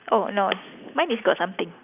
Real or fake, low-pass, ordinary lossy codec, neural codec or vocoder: real; 3.6 kHz; none; none